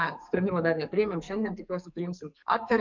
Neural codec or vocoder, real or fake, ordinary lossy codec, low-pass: codec, 44.1 kHz, 2.6 kbps, SNAC; fake; MP3, 64 kbps; 7.2 kHz